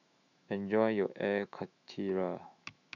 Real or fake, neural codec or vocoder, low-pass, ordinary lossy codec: fake; autoencoder, 48 kHz, 128 numbers a frame, DAC-VAE, trained on Japanese speech; 7.2 kHz; none